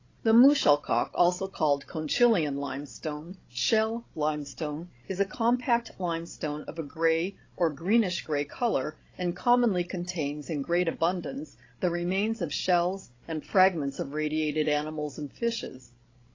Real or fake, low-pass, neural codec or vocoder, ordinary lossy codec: fake; 7.2 kHz; codec, 16 kHz, 16 kbps, FreqCodec, larger model; AAC, 32 kbps